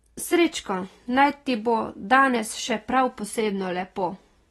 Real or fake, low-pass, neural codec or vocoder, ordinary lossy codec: real; 19.8 kHz; none; AAC, 32 kbps